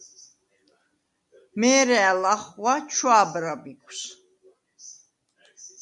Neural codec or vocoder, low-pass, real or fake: none; 10.8 kHz; real